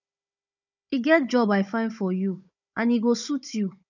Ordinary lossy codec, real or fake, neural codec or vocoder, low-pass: none; fake; codec, 16 kHz, 16 kbps, FunCodec, trained on Chinese and English, 50 frames a second; 7.2 kHz